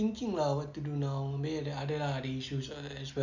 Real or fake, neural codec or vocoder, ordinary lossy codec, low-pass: real; none; none; 7.2 kHz